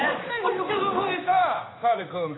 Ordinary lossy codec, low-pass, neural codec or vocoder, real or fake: AAC, 16 kbps; 7.2 kHz; codec, 16 kHz in and 24 kHz out, 1 kbps, XY-Tokenizer; fake